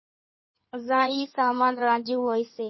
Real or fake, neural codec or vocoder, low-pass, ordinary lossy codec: fake; codec, 16 kHz in and 24 kHz out, 2.2 kbps, FireRedTTS-2 codec; 7.2 kHz; MP3, 24 kbps